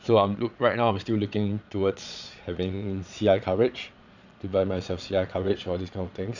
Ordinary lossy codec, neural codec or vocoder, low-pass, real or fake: none; vocoder, 22.05 kHz, 80 mel bands, Vocos; 7.2 kHz; fake